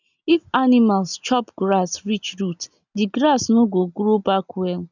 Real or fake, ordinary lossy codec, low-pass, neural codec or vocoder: real; none; 7.2 kHz; none